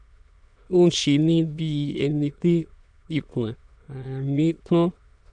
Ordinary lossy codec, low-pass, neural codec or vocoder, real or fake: none; 9.9 kHz; autoencoder, 22.05 kHz, a latent of 192 numbers a frame, VITS, trained on many speakers; fake